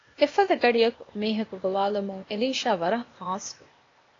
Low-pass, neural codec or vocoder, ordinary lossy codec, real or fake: 7.2 kHz; codec, 16 kHz, 0.8 kbps, ZipCodec; AAC, 32 kbps; fake